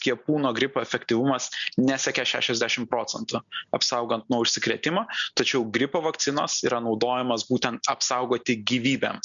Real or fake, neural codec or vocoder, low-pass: real; none; 7.2 kHz